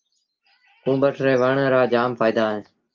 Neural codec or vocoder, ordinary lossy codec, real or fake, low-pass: none; Opus, 24 kbps; real; 7.2 kHz